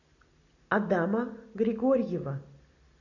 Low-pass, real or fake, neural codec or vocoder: 7.2 kHz; real; none